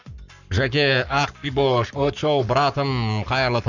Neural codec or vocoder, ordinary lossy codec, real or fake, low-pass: codec, 44.1 kHz, 7.8 kbps, Pupu-Codec; none; fake; 7.2 kHz